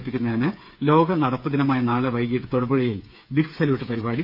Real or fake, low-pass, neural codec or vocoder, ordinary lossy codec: fake; 5.4 kHz; codec, 16 kHz, 8 kbps, FreqCodec, smaller model; none